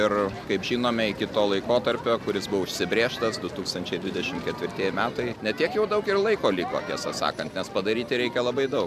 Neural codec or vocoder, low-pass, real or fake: none; 14.4 kHz; real